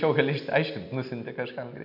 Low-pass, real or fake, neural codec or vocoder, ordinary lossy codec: 5.4 kHz; real; none; AAC, 48 kbps